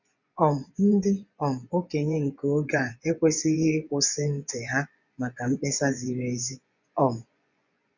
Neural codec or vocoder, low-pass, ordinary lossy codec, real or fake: vocoder, 24 kHz, 100 mel bands, Vocos; 7.2 kHz; none; fake